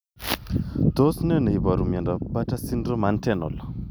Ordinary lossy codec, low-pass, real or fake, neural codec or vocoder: none; none; real; none